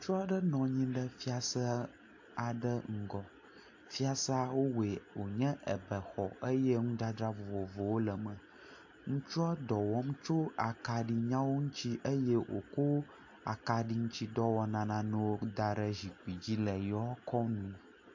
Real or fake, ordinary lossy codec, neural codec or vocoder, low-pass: real; AAC, 48 kbps; none; 7.2 kHz